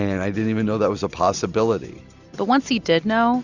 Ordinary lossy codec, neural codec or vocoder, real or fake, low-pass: Opus, 64 kbps; none; real; 7.2 kHz